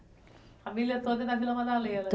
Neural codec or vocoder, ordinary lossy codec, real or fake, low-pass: none; none; real; none